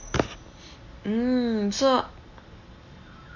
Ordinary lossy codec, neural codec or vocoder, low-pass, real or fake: none; none; 7.2 kHz; real